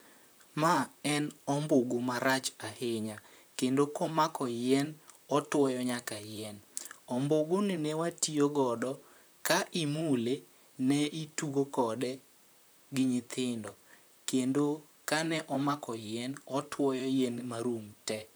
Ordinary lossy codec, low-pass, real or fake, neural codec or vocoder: none; none; fake; vocoder, 44.1 kHz, 128 mel bands, Pupu-Vocoder